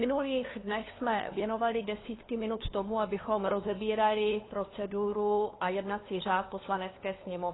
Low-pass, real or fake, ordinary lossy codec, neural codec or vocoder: 7.2 kHz; fake; AAC, 16 kbps; codec, 16 kHz, 2 kbps, FunCodec, trained on LibriTTS, 25 frames a second